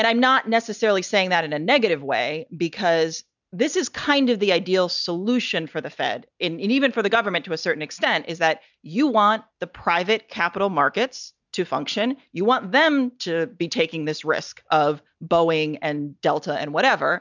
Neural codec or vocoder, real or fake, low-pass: none; real; 7.2 kHz